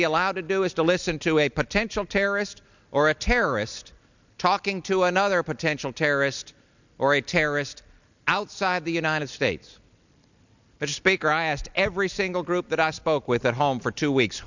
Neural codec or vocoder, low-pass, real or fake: none; 7.2 kHz; real